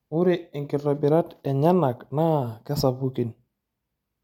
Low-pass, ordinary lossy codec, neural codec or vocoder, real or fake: 19.8 kHz; MP3, 96 kbps; none; real